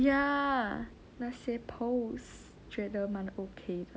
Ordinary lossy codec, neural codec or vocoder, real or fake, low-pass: none; none; real; none